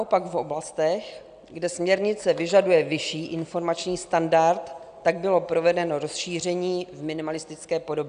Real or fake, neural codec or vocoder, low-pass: real; none; 9.9 kHz